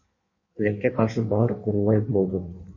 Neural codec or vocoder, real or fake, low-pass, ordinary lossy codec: codec, 16 kHz in and 24 kHz out, 1.1 kbps, FireRedTTS-2 codec; fake; 7.2 kHz; MP3, 32 kbps